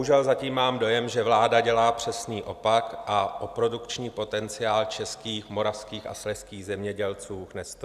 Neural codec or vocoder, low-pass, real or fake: none; 14.4 kHz; real